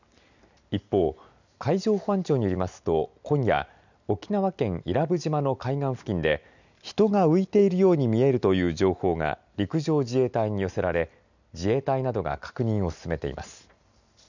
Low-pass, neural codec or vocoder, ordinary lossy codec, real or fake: 7.2 kHz; none; none; real